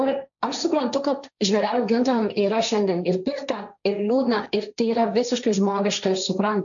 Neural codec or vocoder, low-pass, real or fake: codec, 16 kHz, 1.1 kbps, Voila-Tokenizer; 7.2 kHz; fake